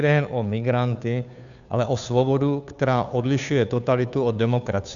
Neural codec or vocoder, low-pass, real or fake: codec, 16 kHz, 2 kbps, FunCodec, trained on Chinese and English, 25 frames a second; 7.2 kHz; fake